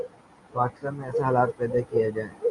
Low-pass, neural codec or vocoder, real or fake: 10.8 kHz; none; real